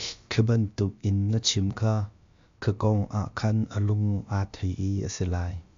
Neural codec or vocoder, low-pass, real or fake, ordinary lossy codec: codec, 16 kHz, about 1 kbps, DyCAST, with the encoder's durations; 7.2 kHz; fake; AAC, 64 kbps